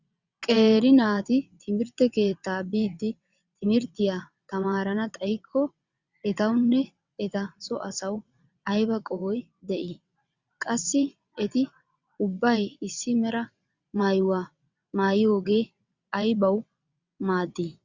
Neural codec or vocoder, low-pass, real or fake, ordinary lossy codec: vocoder, 22.05 kHz, 80 mel bands, WaveNeXt; 7.2 kHz; fake; Opus, 64 kbps